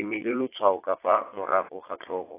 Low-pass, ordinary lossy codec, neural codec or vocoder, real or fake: 3.6 kHz; AAC, 16 kbps; vocoder, 22.05 kHz, 80 mel bands, Vocos; fake